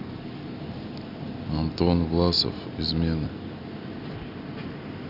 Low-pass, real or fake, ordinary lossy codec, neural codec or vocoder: 5.4 kHz; real; none; none